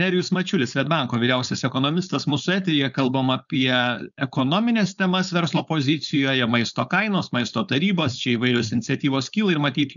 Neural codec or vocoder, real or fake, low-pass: codec, 16 kHz, 4.8 kbps, FACodec; fake; 7.2 kHz